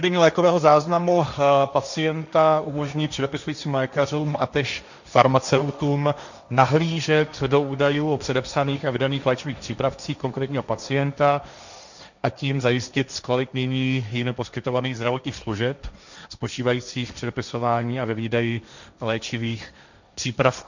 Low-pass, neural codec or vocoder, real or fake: 7.2 kHz; codec, 16 kHz, 1.1 kbps, Voila-Tokenizer; fake